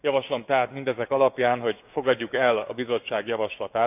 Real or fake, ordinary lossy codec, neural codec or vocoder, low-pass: fake; none; codec, 16 kHz, 6 kbps, DAC; 3.6 kHz